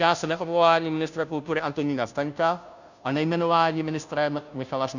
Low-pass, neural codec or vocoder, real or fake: 7.2 kHz; codec, 16 kHz, 0.5 kbps, FunCodec, trained on Chinese and English, 25 frames a second; fake